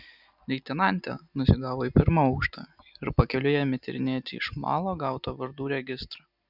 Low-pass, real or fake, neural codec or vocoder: 5.4 kHz; real; none